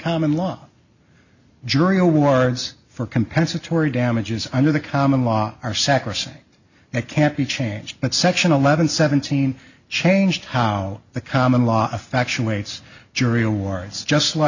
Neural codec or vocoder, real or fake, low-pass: none; real; 7.2 kHz